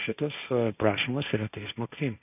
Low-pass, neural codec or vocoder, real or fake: 3.6 kHz; codec, 16 kHz, 1.1 kbps, Voila-Tokenizer; fake